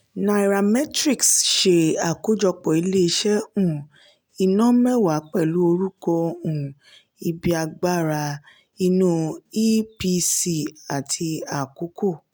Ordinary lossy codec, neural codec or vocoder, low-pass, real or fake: none; none; none; real